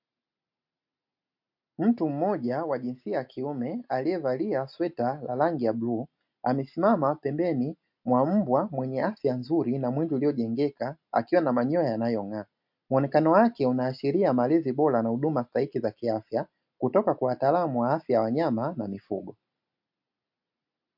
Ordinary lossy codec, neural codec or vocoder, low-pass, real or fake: MP3, 48 kbps; none; 5.4 kHz; real